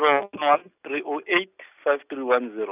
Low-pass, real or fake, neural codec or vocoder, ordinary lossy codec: 3.6 kHz; real; none; none